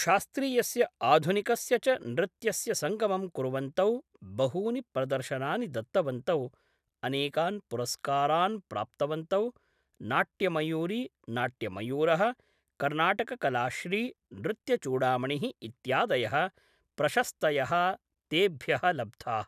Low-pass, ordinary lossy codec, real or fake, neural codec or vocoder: 14.4 kHz; none; real; none